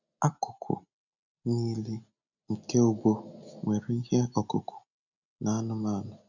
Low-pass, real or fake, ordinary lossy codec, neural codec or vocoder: 7.2 kHz; real; none; none